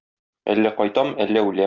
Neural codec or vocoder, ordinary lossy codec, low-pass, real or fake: none; Opus, 64 kbps; 7.2 kHz; real